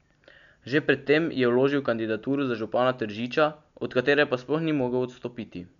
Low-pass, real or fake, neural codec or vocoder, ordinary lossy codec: 7.2 kHz; real; none; none